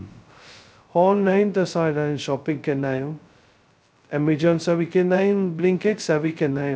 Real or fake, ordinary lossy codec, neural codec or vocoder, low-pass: fake; none; codec, 16 kHz, 0.2 kbps, FocalCodec; none